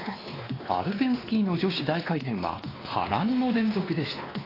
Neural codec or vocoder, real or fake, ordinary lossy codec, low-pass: codec, 16 kHz, 2 kbps, X-Codec, WavLM features, trained on Multilingual LibriSpeech; fake; AAC, 24 kbps; 5.4 kHz